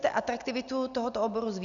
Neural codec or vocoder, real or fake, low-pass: none; real; 7.2 kHz